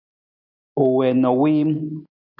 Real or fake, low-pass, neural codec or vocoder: real; 5.4 kHz; none